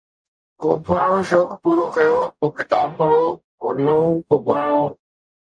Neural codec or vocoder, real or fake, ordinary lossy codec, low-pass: codec, 44.1 kHz, 0.9 kbps, DAC; fake; MP3, 64 kbps; 9.9 kHz